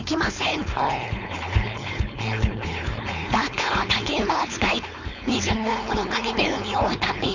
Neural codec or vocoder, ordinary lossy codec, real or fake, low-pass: codec, 16 kHz, 4.8 kbps, FACodec; none; fake; 7.2 kHz